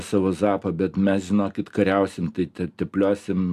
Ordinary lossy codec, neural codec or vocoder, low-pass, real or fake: AAC, 96 kbps; none; 14.4 kHz; real